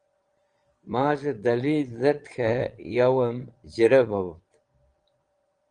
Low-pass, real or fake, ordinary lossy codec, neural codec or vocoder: 9.9 kHz; fake; Opus, 24 kbps; vocoder, 22.05 kHz, 80 mel bands, Vocos